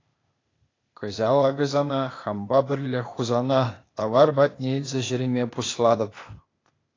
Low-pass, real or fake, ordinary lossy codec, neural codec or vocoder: 7.2 kHz; fake; AAC, 32 kbps; codec, 16 kHz, 0.8 kbps, ZipCodec